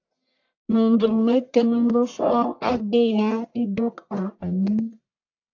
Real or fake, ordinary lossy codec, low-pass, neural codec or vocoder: fake; AAC, 48 kbps; 7.2 kHz; codec, 44.1 kHz, 1.7 kbps, Pupu-Codec